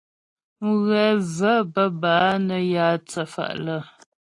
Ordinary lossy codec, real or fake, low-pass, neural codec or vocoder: AAC, 48 kbps; real; 10.8 kHz; none